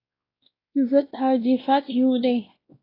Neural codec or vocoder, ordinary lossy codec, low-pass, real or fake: codec, 16 kHz, 1 kbps, X-Codec, WavLM features, trained on Multilingual LibriSpeech; AAC, 24 kbps; 5.4 kHz; fake